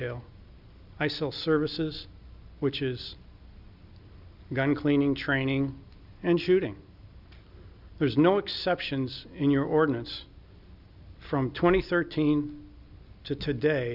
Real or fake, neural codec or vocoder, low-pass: real; none; 5.4 kHz